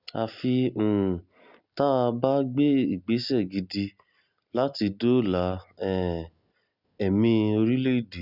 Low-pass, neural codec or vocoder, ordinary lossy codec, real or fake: 5.4 kHz; none; Opus, 64 kbps; real